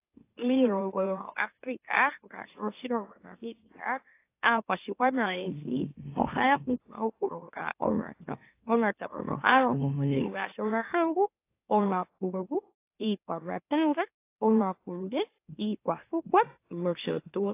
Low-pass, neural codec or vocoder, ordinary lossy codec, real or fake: 3.6 kHz; autoencoder, 44.1 kHz, a latent of 192 numbers a frame, MeloTTS; AAC, 24 kbps; fake